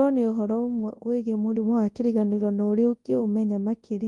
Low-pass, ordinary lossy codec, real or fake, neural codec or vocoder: 10.8 kHz; Opus, 24 kbps; fake; codec, 24 kHz, 0.9 kbps, WavTokenizer, large speech release